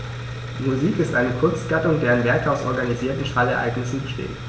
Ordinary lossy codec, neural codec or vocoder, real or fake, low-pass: none; none; real; none